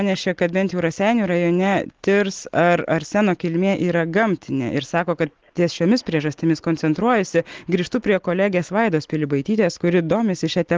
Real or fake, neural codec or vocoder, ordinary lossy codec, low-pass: real; none; Opus, 16 kbps; 7.2 kHz